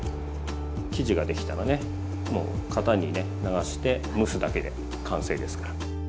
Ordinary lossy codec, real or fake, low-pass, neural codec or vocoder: none; real; none; none